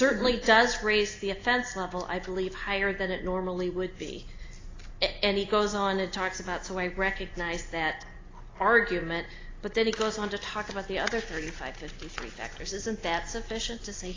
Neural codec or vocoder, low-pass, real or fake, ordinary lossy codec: none; 7.2 kHz; real; AAC, 32 kbps